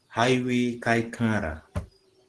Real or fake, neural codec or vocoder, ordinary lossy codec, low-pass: real; none; Opus, 16 kbps; 10.8 kHz